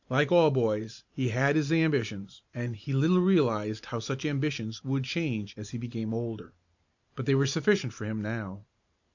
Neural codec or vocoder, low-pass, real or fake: vocoder, 44.1 kHz, 128 mel bands every 512 samples, BigVGAN v2; 7.2 kHz; fake